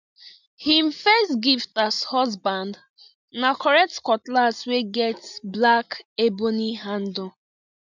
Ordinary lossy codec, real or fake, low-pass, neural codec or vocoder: none; real; 7.2 kHz; none